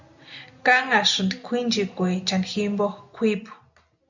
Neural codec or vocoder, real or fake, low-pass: none; real; 7.2 kHz